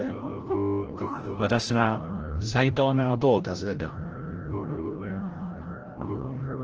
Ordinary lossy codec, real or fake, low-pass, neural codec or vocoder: Opus, 16 kbps; fake; 7.2 kHz; codec, 16 kHz, 0.5 kbps, FreqCodec, larger model